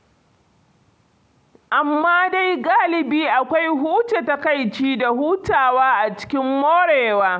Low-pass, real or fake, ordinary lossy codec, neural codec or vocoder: none; real; none; none